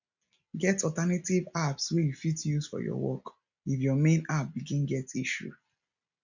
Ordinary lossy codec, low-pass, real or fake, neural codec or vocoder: none; 7.2 kHz; real; none